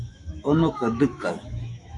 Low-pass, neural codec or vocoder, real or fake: 10.8 kHz; autoencoder, 48 kHz, 128 numbers a frame, DAC-VAE, trained on Japanese speech; fake